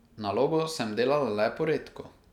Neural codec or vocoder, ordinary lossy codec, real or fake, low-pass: none; none; real; 19.8 kHz